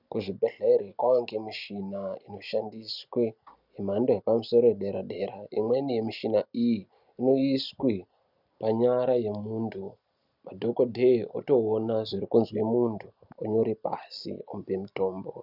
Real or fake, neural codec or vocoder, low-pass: real; none; 5.4 kHz